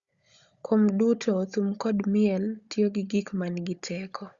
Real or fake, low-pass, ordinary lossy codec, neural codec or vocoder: fake; 7.2 kHz; Opus, 64 kbps; codec, 16 kHz, 16 kbps, FunCodec, trained on Chinese and English, 50 frames a second